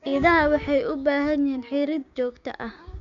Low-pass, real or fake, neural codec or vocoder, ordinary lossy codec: 7.2 kHz; fake; codec, 16 kHz, 6 kbps, DAC; none